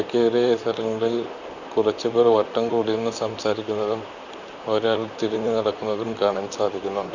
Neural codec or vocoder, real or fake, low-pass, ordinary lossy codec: vocoder, 44.1 kHz, 128 mel bands, Pupu-Vocoder; fake; 7.2 kHz; none